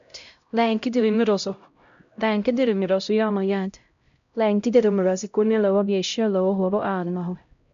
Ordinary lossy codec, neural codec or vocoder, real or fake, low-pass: MP3, 64 kbps; codec, 16 kHz, 0.5 kbps, X-Codec, HuBERT features, trained on LibriSpeech; fake; 7.2 kHz